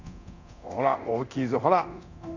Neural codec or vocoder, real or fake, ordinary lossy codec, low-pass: codec, 24 kHz, 0.9 kbps, DualCodec; fake; none; 7.2 kHz